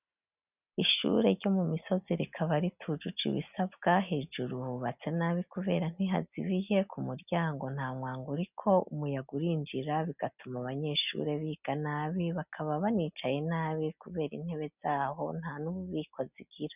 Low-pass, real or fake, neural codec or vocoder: 3.6 kHz; real; none